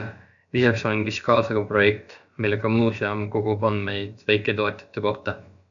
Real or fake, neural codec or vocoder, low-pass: fake; codec, 16 kHz, about 1 kbps, DyCAST, with the encoder's durations; 7.2 kHz